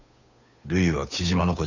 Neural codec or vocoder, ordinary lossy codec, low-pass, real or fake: codec, 16 kHz, 8 kbps, FunCodec, trained on Chinese and English, 25 frames a second; none; 7.2 kHz; fake